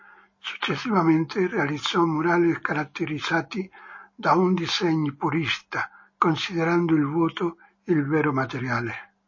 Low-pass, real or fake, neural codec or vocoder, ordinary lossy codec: 7.2 kHz; real; none; MP3, 32 kbps